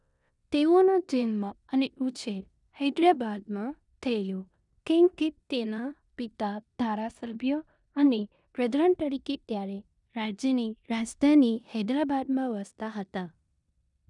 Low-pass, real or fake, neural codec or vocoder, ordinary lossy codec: 10.8 kHz; fake; codec, 16 kHz in and 24 kHz out, 0.9 kbps, LongCat-Audio-Codec, four codebook decoder; none